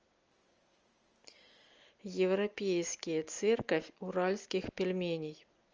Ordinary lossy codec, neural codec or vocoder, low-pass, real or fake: Opus, 24 kbps; none; 7.2 kHz; real